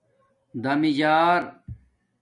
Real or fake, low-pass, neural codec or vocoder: real; 10.8 kHz; none